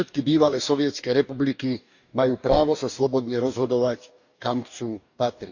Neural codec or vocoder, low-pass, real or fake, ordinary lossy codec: codec, 44.1 kHz, 2.6 kbps, DAC; 7.2 kHz; fake; none